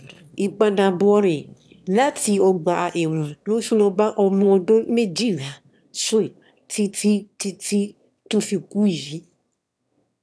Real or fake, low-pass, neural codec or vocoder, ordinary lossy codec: fake; none; autoencoder, 22.05 kHz, a latent of 192 numbers a frame, VITS, trained on one speaker; none